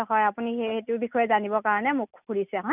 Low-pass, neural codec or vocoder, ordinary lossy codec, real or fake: 3.6 kHz; none; none; real